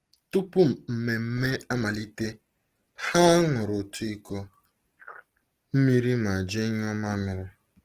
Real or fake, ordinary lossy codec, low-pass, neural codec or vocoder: real; Opus, 16 kbps; 14.4 kHz; none